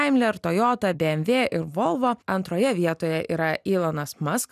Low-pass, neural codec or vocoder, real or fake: 14.4 kHz; none; real